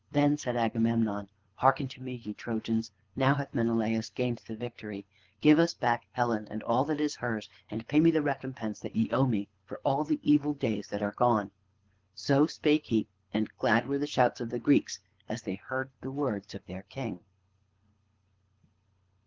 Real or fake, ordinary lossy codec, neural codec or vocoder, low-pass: fake; Opus, 16 kbps; codec, 24 kHz, 6 kbps, HILCodec; 7.2 kHz